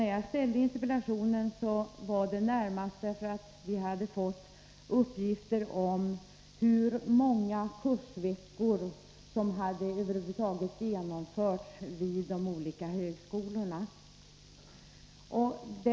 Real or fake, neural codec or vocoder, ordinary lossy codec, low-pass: real; none; none; none